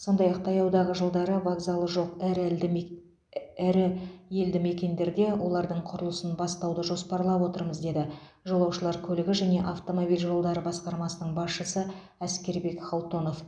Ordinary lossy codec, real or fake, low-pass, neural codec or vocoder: none; real; 9.9 kHz; none